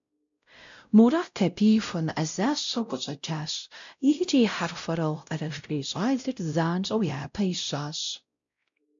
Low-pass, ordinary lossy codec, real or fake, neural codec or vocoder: 7.2 kHz; MP3, 64 kbps; fake; codec, 16 kHz, 0.5 kbps, X-Codec, WavLM features, trained on Multilingual LibriSpeech